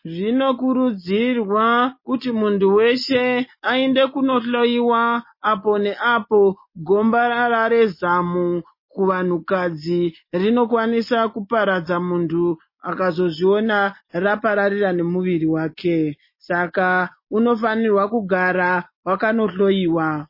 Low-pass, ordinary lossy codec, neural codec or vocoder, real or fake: 5.4 kHz; MP3, 24 kbps; none; real